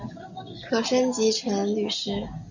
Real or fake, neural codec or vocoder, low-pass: real; none; 7.2 kHz